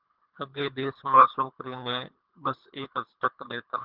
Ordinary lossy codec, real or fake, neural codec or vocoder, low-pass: Opus, 32 kbps; fake; codec, 24 kHz, 3 kbps, HILCodec; 5.4 kHz